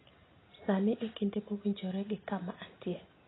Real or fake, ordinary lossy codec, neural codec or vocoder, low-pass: real; AAC, 16 kbps; none; 7.2 kHz